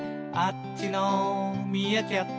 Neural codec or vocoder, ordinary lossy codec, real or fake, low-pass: none; none; real; none